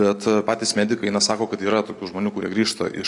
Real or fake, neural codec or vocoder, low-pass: real; none; 10.8 kHz